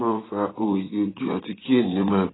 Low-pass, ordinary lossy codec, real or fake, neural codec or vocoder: 7.2 kHz; AAC, 16 kbps; fake; vocoder, 24 kHz, 100 mel bands, Vocos